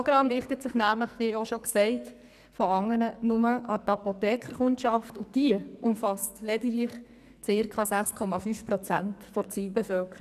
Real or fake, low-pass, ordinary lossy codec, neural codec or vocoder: fake; 14.4 kHz; none; codec, 44.1 kHz, 2.6 kbps, SNAC